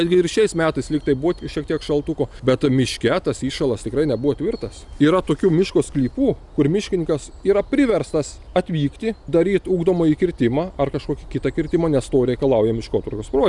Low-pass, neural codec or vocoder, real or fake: 10.8 kHz; none; real